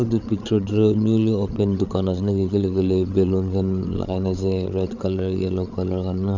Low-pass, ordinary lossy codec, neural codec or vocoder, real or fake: 7.2 kHz; none; codec, 16 kHz, 8 kbps, FreqCodec, larger model; fake